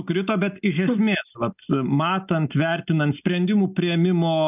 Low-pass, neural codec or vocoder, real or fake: 3.6 kHz; none; real